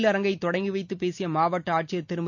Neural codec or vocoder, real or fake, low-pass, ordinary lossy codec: none; real; 7.2 kHz; MP3, 48 kbps